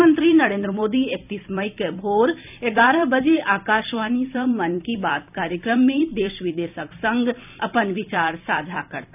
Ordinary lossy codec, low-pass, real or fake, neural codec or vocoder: none; 3.6 kHz; fake; vocoder, 44.1 kHz, 128 mel bands every 256 samples, BigVGAN v2